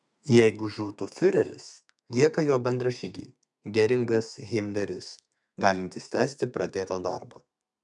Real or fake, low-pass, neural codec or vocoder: fake; 10.8 kHz; codec, 32 kHz, 1.9 kbps, SNAC